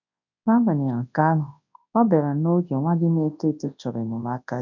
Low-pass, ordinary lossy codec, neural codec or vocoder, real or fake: 7.2 kHz; none; codec, 24 kHz, 0.9 kbps, WavTokenizer, large speech release; fake